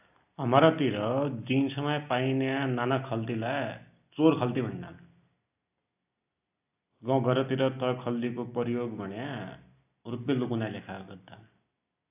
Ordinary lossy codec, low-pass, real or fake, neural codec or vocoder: AAC, 32 kbps; 3.6 kHz; real; none